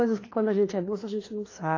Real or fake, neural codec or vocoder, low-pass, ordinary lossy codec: fake; codec, 16 kHz, 2 kbps, FreqCodec, larger model; 7.2 kHz; AAC, 32 kbps